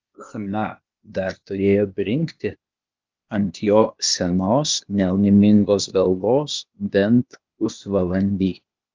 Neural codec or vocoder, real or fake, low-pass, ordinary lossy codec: codec, 16 kHz, 0.8 kbps, ZipCodec; fake; 7.2 kHz; Opus, 32 kbps